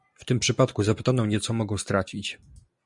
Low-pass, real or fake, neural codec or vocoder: 10.8 kHz; real; none